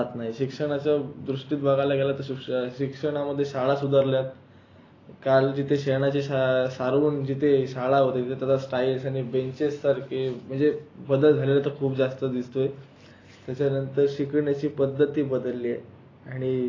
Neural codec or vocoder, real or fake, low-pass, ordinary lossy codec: none; real; 7.2 kHz; AAC, 32 kbps